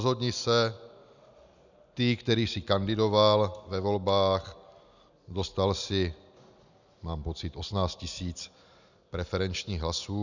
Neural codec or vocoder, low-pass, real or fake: none; 7.2 kHz; real